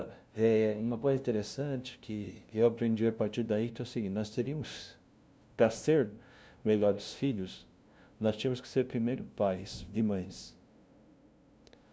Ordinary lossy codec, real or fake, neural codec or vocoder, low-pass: none; fake; codec, 16 kHz, 0.5 kbps, FunCodec, trained on LibriTTS, 25 frames a second; none